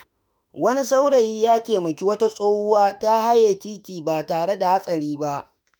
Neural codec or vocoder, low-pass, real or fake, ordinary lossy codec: autoencoder, 48 kHz, 32 numbers a frame, DAC-VAE, trained on Japanese speech; none; fake; none